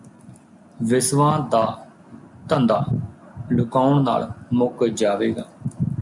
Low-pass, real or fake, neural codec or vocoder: 10.8 kHz; real; none